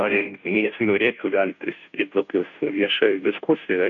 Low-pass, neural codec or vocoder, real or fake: 7.2 kHz; codec, 16 kHz, 0.5 kbps, FunCodec, trained on Chinese and English, 25 frames a second; fake